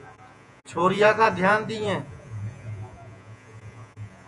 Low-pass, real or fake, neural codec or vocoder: 10.8 kHz; fake; vocoder, 48 kHz, 128 mel bands, Vocos